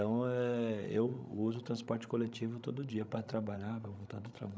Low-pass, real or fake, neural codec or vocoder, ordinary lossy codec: none; fake; codec, 16 kHz, 16 kbps, FreqCodec, larger model; none